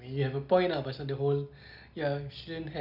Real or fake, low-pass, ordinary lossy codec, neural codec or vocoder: real; 5.4 kHz; none; none